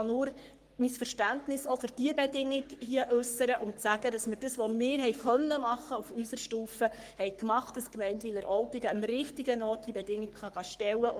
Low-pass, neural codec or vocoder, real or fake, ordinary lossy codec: 14.4 kHz; codec, 44.1 kHz, 3.4 kbps, Pupu-Codec; fake; Opus, 24 kbps